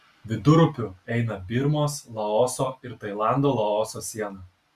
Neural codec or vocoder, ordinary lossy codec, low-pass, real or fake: none; Opus, 64 kbps; 14.4 kHz; real